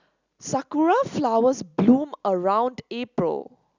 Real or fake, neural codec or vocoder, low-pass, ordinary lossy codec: real; none; 7.2 kHz; Opus, 64 kbps